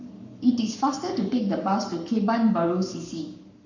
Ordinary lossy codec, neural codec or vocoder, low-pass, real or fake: none; codec, 44.1 kHz, 7.8 kbps, Pupu-Codec; 7.2 kHz; fake